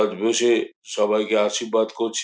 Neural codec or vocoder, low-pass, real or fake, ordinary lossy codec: none; none; real; none